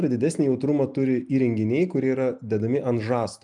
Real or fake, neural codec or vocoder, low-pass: real; none; 10.8 kHz